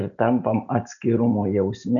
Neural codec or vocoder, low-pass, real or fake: none; 7.2 kHz; real